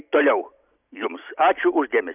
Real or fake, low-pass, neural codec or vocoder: real; 3.6 kHz; none